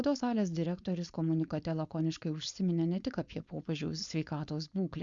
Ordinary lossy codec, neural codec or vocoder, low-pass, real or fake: Opus, 64 kbps; codec, 16 kHz, 4.8 kbps, FACodec; 7.2 kHz; fake